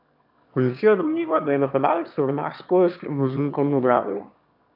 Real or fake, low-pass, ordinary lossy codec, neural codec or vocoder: fake; 5.4 kHz; AAC, 48 kbps; autoencoder, 22.05 kHz, a latent of 192 numbers a frame, VITS, trained on one speaker